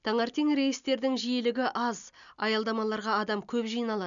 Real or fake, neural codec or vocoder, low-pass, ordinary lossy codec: real; none; 7.2 kHz; none